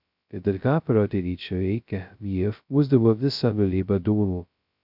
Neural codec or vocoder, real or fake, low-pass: codec, 16 kHz, 0.2 kbps, FocalCodec; fake; 5.4 kHz